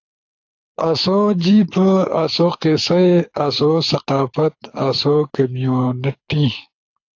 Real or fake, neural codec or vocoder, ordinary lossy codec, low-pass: fake; codec, 24 kHz, 6 kbps, HILCodec; AAC, 48 kbps; 7.2 kHz